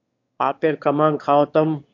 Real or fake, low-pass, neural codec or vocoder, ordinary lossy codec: fake; 7.2 kHz; autoencoder, 22.05 kHz, a latent of 192 numbers a frame, VITS, trained on one speaker; AAC, 48 kbps